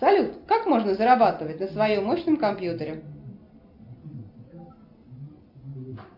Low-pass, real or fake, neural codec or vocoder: 5.4 kHz; real; none